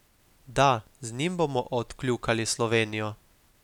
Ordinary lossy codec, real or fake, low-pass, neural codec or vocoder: none; real; 19.8 kHz; none